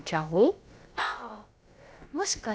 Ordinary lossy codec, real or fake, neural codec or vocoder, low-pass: none; fake; codec, 16 kHz, about 1 kbps, DyCAST, with the encoder's durations; none